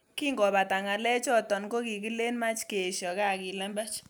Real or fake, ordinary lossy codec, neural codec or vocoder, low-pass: real; none; none; none